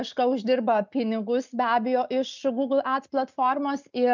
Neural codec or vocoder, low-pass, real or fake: none; 7.2 kHz; real